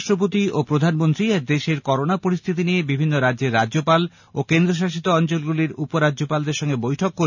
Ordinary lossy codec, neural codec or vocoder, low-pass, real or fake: none; none; 7.2 kHz; real